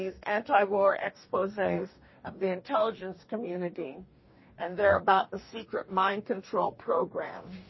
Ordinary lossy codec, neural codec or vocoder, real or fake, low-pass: MP3, 24 kbps; codec, 44.1 kHz, 2.6 kbps, DAC; fake; 7.2 kHz